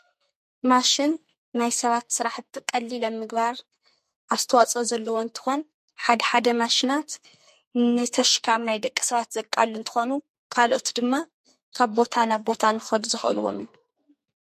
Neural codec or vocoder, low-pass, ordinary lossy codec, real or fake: codec, 44.1 kHz, 2.6 kbps, SNAC; 14.4 kHz; MP3, 64 kbps; fake